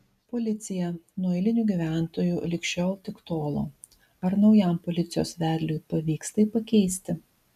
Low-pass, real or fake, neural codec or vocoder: 14.4 kHz; real; none